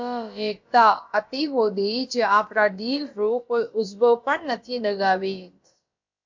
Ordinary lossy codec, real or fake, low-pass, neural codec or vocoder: MP3, 48 kbps; fake; 7.2 kHz; codec, 16 kHz, about 1 kbps, DyCAST, with the encoder's durations